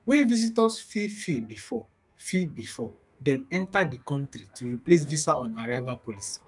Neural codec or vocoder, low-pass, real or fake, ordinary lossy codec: codec, 32 kHz, 1.9 kbps, SNAC; 10.8 kHz; fake; none